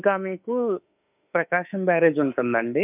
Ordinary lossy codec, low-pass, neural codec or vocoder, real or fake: none; 3.6 kHz; autoencoder, 48 kHz, 32 numbers a frame, DAC-VAE, trained on Japanese speech; fake